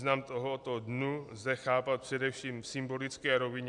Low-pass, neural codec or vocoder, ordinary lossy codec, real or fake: 10.8 kHz; none; MP3, 96 kbps; real